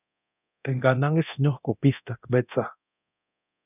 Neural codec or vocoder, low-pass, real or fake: codec, 24 kHz, 0.9 kbps, DualCodec; 3.6 kHz; fake